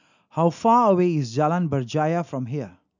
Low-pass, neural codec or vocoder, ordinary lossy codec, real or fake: 7.2 kHz; none; none; real